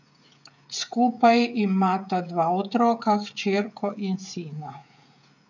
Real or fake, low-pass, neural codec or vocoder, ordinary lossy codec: fake; 7.2 kHz; codec, 16 kHz, 16 kbps, FreqCodec, smaller model; none